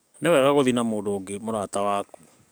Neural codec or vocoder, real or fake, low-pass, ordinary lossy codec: codec, 44.1 kHz, 7.8 kbps, DAC; fake; none; none